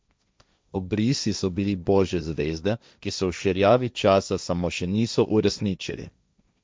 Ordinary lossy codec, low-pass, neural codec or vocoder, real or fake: none; none; codec, 16 kHz, 1.1 kbps, Voila-Tokenizer; fake